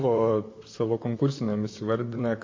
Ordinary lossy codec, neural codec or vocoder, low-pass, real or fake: MP3, 32 kbps; vocoder, 44.1 kHz, 128 mel bands, Pupu-Vocoder; 7.2 kHz; fake